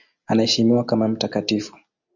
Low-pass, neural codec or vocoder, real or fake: 7.2 kHz; none; real